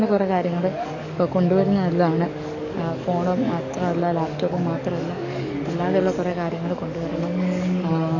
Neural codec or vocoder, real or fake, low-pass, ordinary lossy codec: codec, 44.1 kHz, 7.8 kbps, DAC; fake; 7.2 kHz; none